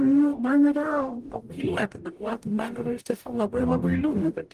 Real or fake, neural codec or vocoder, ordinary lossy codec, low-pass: fake; codec, 44.1 kHz, 0.9 kbps, DAC; Opus, 24 kbps; 14.4 kHz